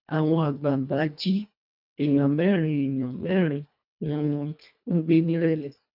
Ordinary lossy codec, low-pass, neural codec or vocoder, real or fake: none; 5.4 kHz; codec, 24 kHz, 1.5 kbps, HILCodec; fake